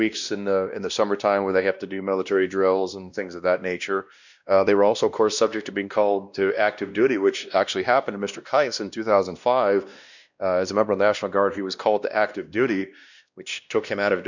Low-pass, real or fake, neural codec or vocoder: 7.2 kHz; fake; codec, 16 kHz, 1 kbps, X-Codec, WavLM features, trained on Multilingual LibriSpeech